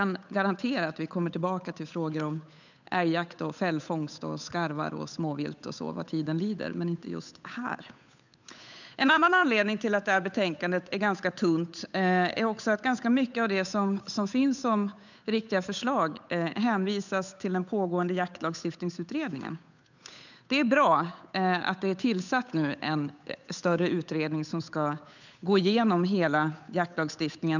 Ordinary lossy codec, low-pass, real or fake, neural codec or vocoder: none; 7.2 kHz; fake; codec, 16 kHz, 8 kbps, FunCodec, trained on Chinese and English, 25 frames a second